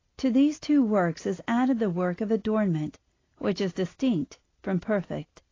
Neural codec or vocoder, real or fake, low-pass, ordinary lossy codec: none; real; 7.2 kHz; AAC, 32 kbps